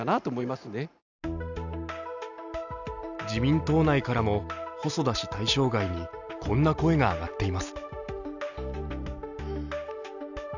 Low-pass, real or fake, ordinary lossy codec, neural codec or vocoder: 7.2 kHz; real; none; none